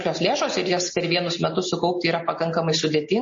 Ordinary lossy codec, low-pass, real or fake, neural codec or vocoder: MP3, 32 kbps; 7.2 kHz; real; none